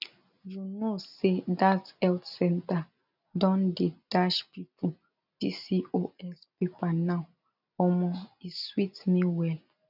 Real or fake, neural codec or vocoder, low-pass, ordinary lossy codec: real; none; 5.4 kHz; none